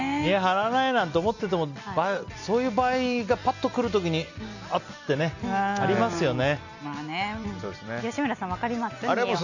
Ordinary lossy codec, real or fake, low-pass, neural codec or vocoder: none; real; 7.2 kHz; none